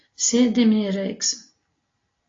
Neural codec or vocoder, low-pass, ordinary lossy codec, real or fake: none; 7.2 kHz; AAC, 32 kbps; real